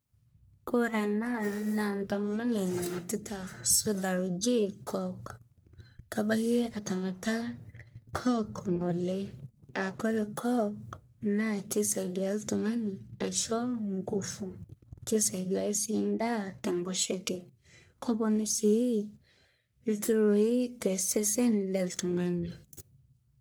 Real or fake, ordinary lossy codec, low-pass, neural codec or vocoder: fake; none; none; codec, 44.1 kHz, 1.7 kbps, Pupu-Codec